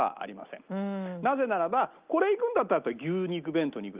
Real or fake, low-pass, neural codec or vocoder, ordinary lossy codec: real; 3.6 kHz; none; Opus, 24 kbps